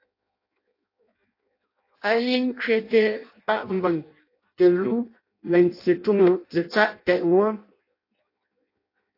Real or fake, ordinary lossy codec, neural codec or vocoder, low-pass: fake; AAC, 32 kbps; codec, 16 kHz in and 24 kHz out, 0.6 kbps, FireRedTTS-2 codec; 5.4 kHz